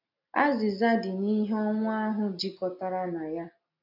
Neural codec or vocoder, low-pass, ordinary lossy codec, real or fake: none; 5.4 kHz; MP3, 48 kbps; real